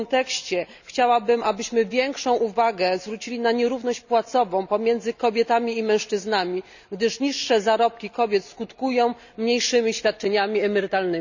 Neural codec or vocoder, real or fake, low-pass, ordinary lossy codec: none; real; 7.2 kHz; none